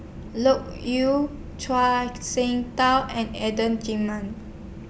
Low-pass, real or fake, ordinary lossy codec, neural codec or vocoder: none; real; none; none